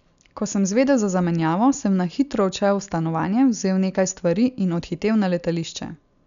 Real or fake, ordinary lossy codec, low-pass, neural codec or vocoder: real; none; 7.2 kHz; none